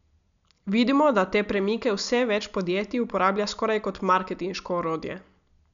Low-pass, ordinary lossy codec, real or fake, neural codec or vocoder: 7.2 kHz; none; real; none